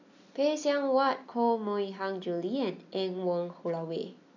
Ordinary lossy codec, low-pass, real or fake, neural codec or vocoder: none; 7.2 kHz; real; none